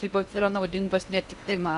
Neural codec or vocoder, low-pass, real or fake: codec, 16 kHz in and 24 kHz out, 0.8 kbps, FocalCodec, streaming, 65536 codes; 10.8 kHz; fake